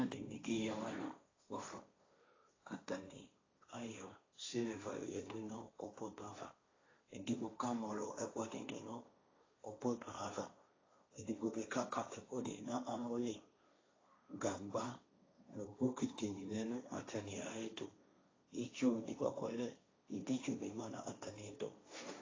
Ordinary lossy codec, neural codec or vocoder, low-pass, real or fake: AAC, 32 kbps; codec, 16 kHz, 1.1 kbps, Voila-Tokenizer; 7.2 kHz; fake